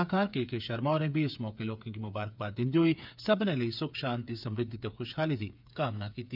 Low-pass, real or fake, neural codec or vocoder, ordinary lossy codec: 5.4 kHz; fake; codec, 16 kHz, 8 kbps, FreqCodec, smaller model; none